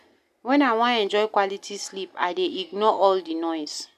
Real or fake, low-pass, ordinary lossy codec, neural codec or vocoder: real; 14.4 kHz; none; none